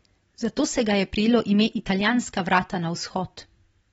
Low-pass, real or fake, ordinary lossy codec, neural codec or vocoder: 19.8 kHz; fake; AAC, 24 kbps; vocoder, 44.1 kHz, 128 mel bands every 256 samples, BigVGAN v2